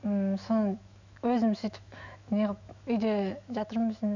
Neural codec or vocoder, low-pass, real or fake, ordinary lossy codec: autoencoder, 48 kHz, 128 numbers a frame, DAC-VAE, trained on Japanese speech; 7.2 kHz; fake; none